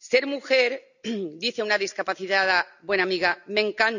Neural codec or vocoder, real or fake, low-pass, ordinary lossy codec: none; real; 7.2 kHz; none